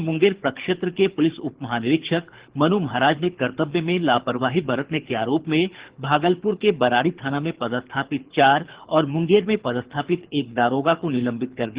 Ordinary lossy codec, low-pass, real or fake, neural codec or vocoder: Opus, 16 kbps; 3.6 kHz; fake; codec, 24 kHz, 6 kbps, HILCodec